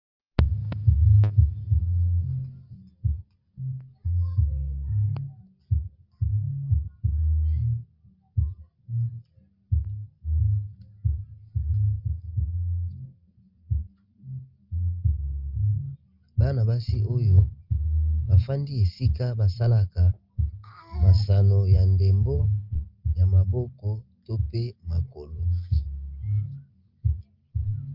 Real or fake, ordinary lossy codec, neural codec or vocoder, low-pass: real; Opus, 32 kbps; none; 5.4 kHz